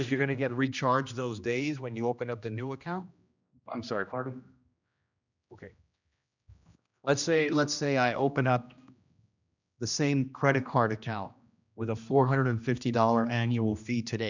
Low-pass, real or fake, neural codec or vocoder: 7.2 kHz; fake; codec, 16 kHz, 1 kbps, X-Codec, HuBERT features, trained on general audio